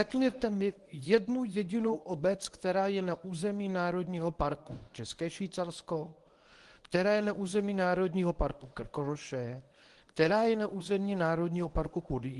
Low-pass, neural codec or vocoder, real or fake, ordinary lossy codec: 10.8 kHz; codec, 24 kHz, 0.9 kbps, WavTokenizer, medium speech release version 1; fake; Opus, 24 kbps